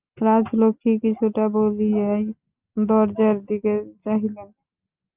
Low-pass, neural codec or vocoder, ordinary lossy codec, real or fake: 3.6 kHz; none; Opus, 24 kbps; real